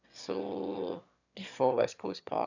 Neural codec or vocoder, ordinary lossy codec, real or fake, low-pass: autoencoder, 22.05 kHz, a latent of 192 numbers a frame, VITS, trained on one speaker; none; fake; 7.2 kHz